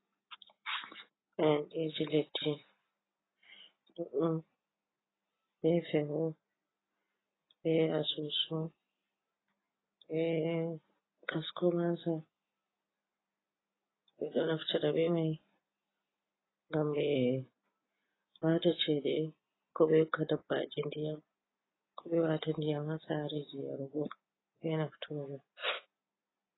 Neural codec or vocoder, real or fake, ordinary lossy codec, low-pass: none; real; AAC, 16 kbps; 7.2 kHz